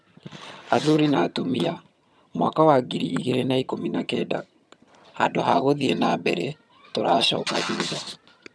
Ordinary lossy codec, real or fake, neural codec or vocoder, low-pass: none; fake; vocoder, 22.05 kHz, 80 mel bands, HiFi-GAN; none